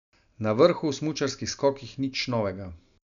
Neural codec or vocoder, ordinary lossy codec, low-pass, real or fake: none; none; 7.2 kHz; real